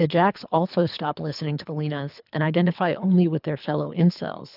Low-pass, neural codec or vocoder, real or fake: 5.4 kHz; codec, 24 kHz, 3 kbps, HILCodec; fake